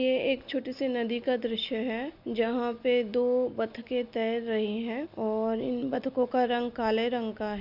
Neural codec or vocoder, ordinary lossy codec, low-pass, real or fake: none; none; 5.4 kHz; real